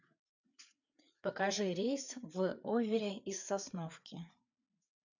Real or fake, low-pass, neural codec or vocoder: fake; 7.2 kHz; codec, 16 kHz, 4 kbps, FreqCodec, larger model